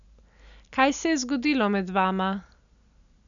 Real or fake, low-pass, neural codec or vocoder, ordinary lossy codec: real; 7.2 kHz; none; none